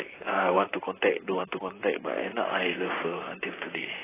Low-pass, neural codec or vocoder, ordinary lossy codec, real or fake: 3.6 kHz; vocoder, 44.1 kHz, 128 mel bands, Pupu-Vocoder; AAC, 16 kbps; fake